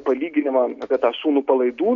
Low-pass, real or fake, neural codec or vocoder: 7.2 kHz; real; none